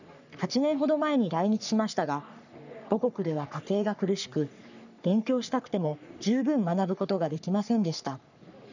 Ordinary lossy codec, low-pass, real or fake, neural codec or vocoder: none; 7.2 kHz; fake; codec, 44.1 kHz, 3.4 kbps, Pupu-Codec